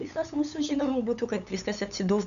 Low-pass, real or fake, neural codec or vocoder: 7.2 kHz; fake; codec, 16 kHz, 8 kbps, FunCodec, trained on LibriTTS, 25 frames a second